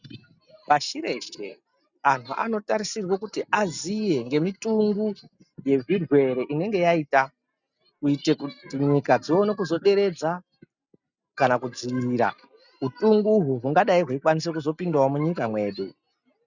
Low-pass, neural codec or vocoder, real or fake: 7.2 kHz; none; real